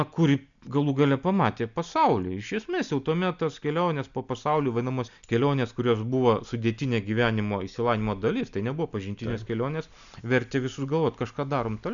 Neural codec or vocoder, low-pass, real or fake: none; 7.2 kHz; real